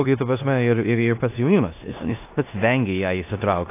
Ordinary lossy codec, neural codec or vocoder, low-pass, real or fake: AAC, 24 kbps; codec, 16 kHz in and 24 kHz out, 0.9 kbps, LongCat-Audio-Codec, four codebook decoder; 3.6 kHz; fake